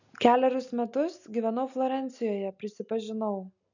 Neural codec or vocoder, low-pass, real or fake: none; 7.2 kHz; real